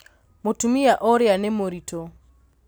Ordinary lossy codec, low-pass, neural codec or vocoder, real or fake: none; none; none; real